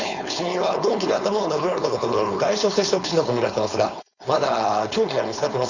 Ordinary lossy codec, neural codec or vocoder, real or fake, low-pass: none; codec, 16 kHz, 4.8 kbps, FACodec; fake; 7.2 kHz